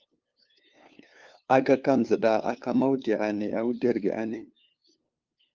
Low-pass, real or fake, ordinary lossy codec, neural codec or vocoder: 7.2 kHz; fake; Opus, 32 kbps; codec, 16 kHz, 2 kbps, FunCodec, trained on LibriTTS, 25 frames a second